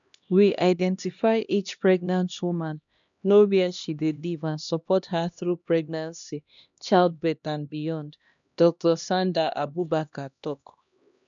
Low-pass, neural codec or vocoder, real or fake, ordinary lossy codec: 7.2 kHz; codec, 16 kHz, 1 kbps, X-Codec, HuBERT features, trained on LibriSpeech; fake; none